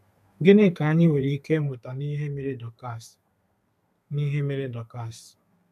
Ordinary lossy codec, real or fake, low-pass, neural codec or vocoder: none; fake; 14.4 kHz; codec, 32 kHz, 1.9 kbps, SNAC